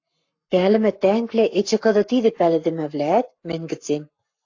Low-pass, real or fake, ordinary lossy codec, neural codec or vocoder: 7.2 kHz; fake; AAC, 48 kbps; codec, 44.1 kHz, 7.8 kbps, Pupu-Codec